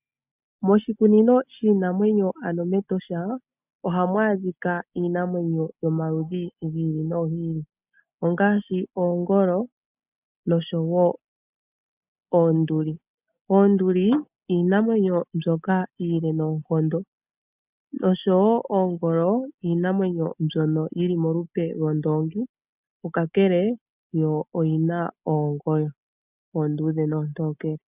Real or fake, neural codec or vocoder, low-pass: real; none; 3.6 kHz